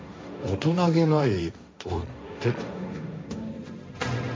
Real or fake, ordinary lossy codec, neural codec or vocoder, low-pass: fake; none; codec, 16 kHz, 1.1 kbps, Voila-Tokenizer; none